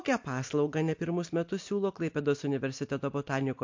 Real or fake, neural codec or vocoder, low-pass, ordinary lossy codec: real; none; 7.2 kHz; MP3, 48 kbps